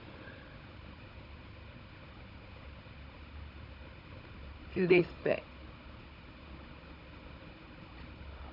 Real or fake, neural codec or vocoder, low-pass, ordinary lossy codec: fake; codec, 16 kHz, 16 kbps, FunCodec, trained on Chinese and English, 50 frames a second; 5.4 kHz; MP3, 48 kbps